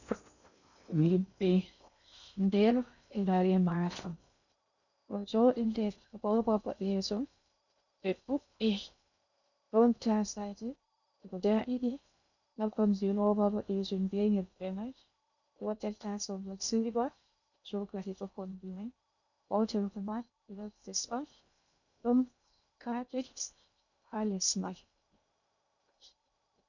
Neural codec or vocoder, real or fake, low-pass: codec, 16 kHz in and 24 kHz out, 0.6 kbps, FocalCodec, streaming, 2048 codes; fake; 7.2 kHz